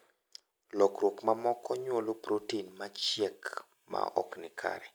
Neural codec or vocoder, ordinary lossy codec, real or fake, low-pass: none; none; real; none